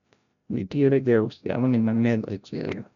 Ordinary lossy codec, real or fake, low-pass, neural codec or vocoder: none; fake; 7.2 kHz; codec, 16 kHz, 0.5 kbps, FreqCodec, larger model